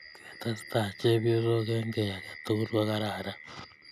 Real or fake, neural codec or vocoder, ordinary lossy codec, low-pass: real; none; none; 14.4 kHz